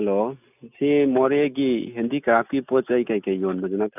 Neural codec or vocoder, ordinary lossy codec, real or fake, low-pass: none; none; real; 3.6 kHz